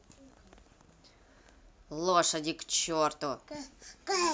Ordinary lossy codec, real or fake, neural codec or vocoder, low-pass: none; real; none; none